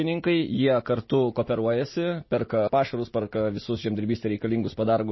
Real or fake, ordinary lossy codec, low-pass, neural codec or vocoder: real; MP3, 24 kbps; 7.2 kHz; none